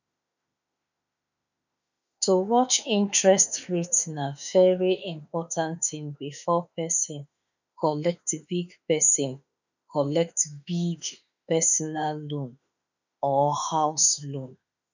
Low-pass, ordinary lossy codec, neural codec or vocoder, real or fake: 7.2 kHz; none; autoencoder, 48 kHz, 32 numbers a frame, DAC-VAE, trained on Japanese speech; fake